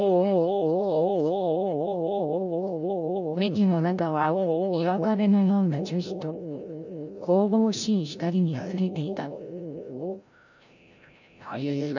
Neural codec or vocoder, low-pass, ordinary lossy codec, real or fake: codec, 16 kHz, 0.5 kbps, FreqCodec, larger model; 7.2 kHz; none; fake